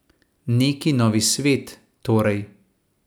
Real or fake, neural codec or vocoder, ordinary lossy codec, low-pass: real; none; none; none